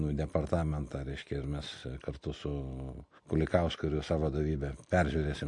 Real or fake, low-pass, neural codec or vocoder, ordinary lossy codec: real; 10.8 kHz; none; MP3, 48 kbps